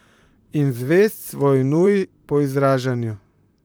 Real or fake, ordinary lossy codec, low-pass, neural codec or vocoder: fake; none; none; vocoder, 44.1 kHz, 128 mel bands, Pupu-Vocoder